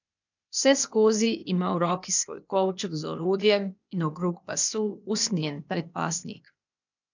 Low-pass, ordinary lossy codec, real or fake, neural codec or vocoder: 7.2 kHz; none; fake; codec, 16 kHz, 0.8 kbps, ZipCodec